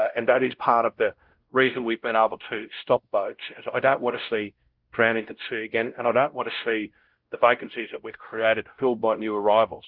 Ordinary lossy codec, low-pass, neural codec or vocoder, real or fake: Opus, 16 kbps; 5.4 kHz; codec, 16 kHz, 0.5 kbps, X-Codec, WavLM features, trained on Multilingual LibriSpeech; fake